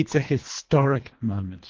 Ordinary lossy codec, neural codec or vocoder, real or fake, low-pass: Opus, 16 kbps; codec, 24 kHz, 1.5 kbps, HILCodec; fake; 7.2 kHz